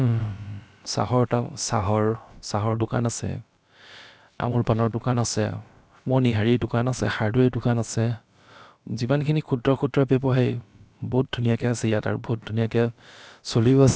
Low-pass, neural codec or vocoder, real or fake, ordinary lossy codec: none; codec, 16 kHz, about 1 kbps, DyCAST, with the encoder's durations; fake; none